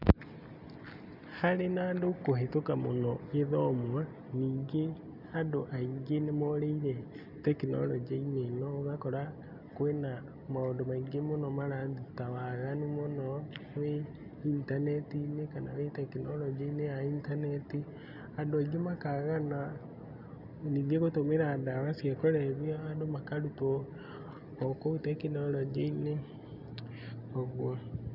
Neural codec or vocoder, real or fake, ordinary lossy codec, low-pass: none; real; none; 5.4 kHz